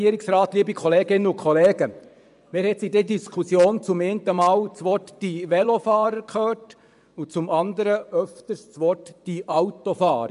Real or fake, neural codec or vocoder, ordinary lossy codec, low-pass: fake; vocoder, 24 kHz, 100 mel bands, Vocos; none; 10.8 kHz